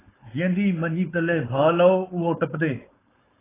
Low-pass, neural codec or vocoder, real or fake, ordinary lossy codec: 3.6 kHz; codec, 16 kHz, 4.8 kbps, FACodec; fake; AAC, 16 kbps